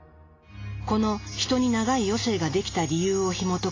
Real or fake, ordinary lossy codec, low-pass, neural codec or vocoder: real; AAC, 32 kbps; 7.2 kHz; none